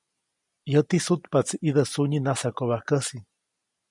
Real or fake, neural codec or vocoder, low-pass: real; none; 10.8 kHz